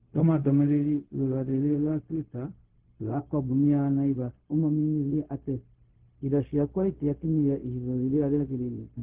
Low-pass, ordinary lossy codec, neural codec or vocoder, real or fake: 3.6 kHz; Opus, 16 kbps; codec, 16 kHz, 0.4 kbps, LongCat-Audio-Codec; fake